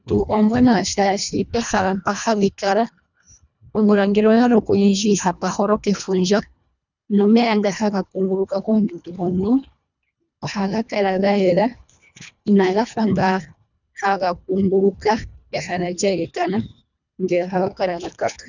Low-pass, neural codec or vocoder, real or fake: 7.2 kHz; codec, 24 kHz, 1.5 kbps, HILCodec; fake